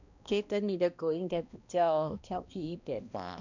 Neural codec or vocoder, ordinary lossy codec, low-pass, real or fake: codec, 16 kHz, 1 kbps, X-Codec, HuBERT features, trained on balanced general audio; none; 7.2 kHz; fake